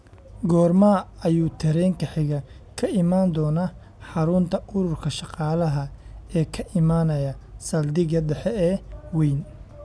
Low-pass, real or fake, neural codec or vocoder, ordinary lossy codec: none; real; none; none